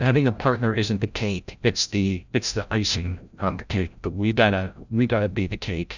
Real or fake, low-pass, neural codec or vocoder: fake; 7.2 kHz; codec, 16 kHz, 0.5 kbps, FreqCodec, larger model